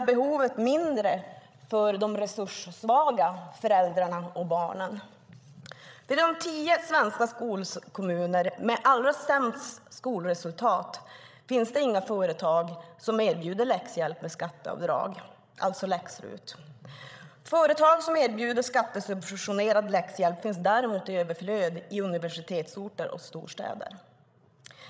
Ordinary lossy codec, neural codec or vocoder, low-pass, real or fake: none; codec, 16 kHz, 16 kbps, FreqCodec, larger model; none; fake